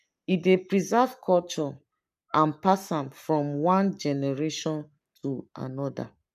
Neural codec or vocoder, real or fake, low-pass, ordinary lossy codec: codec, 44.1 kHz, 7.8 kbps, Pupu-Codec; fake; 14.4 kHz; none